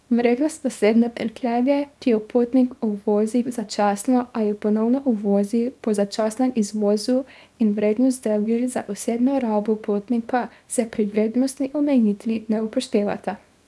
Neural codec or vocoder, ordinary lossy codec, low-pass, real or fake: codec, 24 kHz, 0.9 kbps, WavTokenizer, small release; none; none; fake